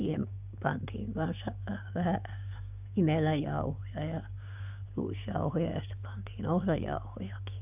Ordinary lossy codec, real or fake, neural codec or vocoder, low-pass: none; fake; codec, 16 kHz, 6 kbps, DAC; 3.6 kHz